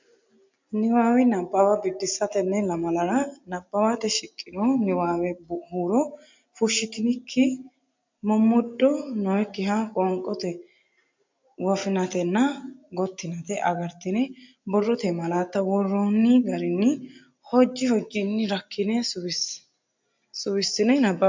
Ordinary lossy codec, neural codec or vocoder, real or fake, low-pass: MP3, 64 kbps; none; real; 7.2 kHz